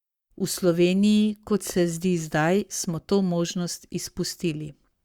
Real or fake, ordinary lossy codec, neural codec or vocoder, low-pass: fake; Opus, 64 kbps; codec, 44.1 kHz, 7.8 kbps, Pupu-Codec; 19.8 kHz